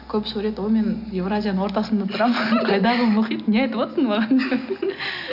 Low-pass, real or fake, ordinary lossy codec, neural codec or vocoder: 5.4 kHz; real; none; none